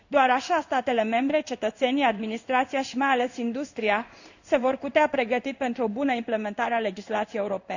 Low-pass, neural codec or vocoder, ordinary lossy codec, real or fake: 7.2 kHz; codec, 16 kHz in and 24 kHz out, 1 kbps, XY-Tokenizer; none; fake